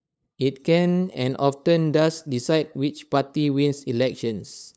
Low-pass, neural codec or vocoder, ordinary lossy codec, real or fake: none; codec, 16 kHz, 8 kbps, FunCodec, trained on LibriTTS, 25 frames a second; none; fake